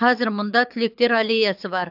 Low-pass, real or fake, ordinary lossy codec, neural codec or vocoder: 5.4 kHz; fake; AAC, 48 kbps; codec, 24 kHz, 6 kbps, HILCodec